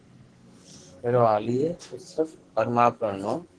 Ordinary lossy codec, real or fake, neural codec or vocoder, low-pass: Opus, 16 kbps; fake; codec, 44.1 kHz, 2.6 kbps, SNAC; 9.9 kHz